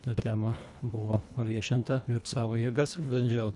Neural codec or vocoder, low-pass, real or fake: codec, 24 kHz, 1.5 kbps, HILCodec; 10.8 kHz; fake